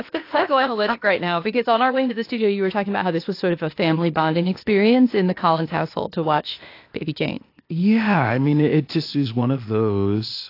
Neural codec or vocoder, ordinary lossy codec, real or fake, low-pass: codec, 16 kHz, 0.8 kbps, ZipCodec; AAC, 32 kbps; fake; 5.4 kHz